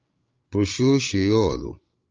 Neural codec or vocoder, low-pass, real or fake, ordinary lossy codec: codec, 16 kHz, 16 kbps, FreqCodec, larger model; 7.2 kHz; fake; Opus, 24 kbps